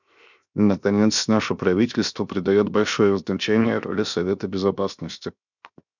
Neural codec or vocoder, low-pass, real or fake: codec, 16 kHz, 0.7 kbps, FocalCodec; 7.2 kHz; fake